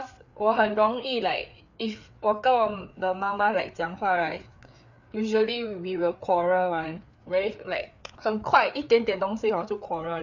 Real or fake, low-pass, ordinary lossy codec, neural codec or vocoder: fake; 7.2 kHz; none; codec, 16 kHz, 4 kbps, FreqCodec, larger model